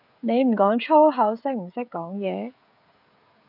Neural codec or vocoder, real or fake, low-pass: codec, 16 kHz, 16 kbps, FreqCodec, smaller model; fake; 5.4 kHz